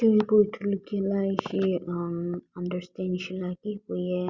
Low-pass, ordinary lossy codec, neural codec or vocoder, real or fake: 7.2 kHz; Opus, 64 kbps; none; real